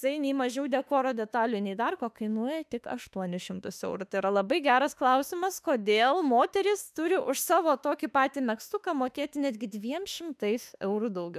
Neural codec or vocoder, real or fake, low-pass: autoencoder, 48 kHz, 32 numbers a frame, DAC-VAE, trained on Japanese speech; fake; 14.4 kHz